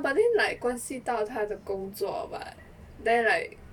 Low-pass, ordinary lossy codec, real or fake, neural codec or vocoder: 19.8 kHz; none; fake; vocoder, 44.1 kHz, 128 mel bands every 512 samples, BigVGAN v2